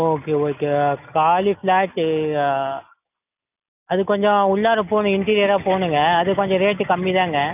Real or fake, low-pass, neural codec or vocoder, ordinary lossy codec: real; 3.6 kHz; none; none